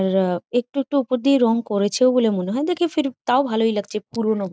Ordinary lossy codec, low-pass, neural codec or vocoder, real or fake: none; none; none; real